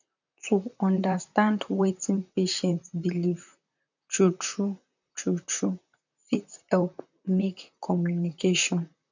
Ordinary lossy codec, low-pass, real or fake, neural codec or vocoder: none; 7.2 kHz; fake; vocoder, 44.1 kHz, 128 mel bands, Pupu-Vocoder